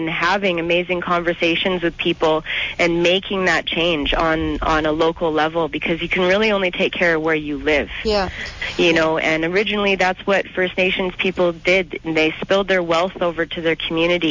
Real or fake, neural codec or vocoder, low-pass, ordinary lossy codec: real; none; 7.2 kHz; MP3, 48 kbps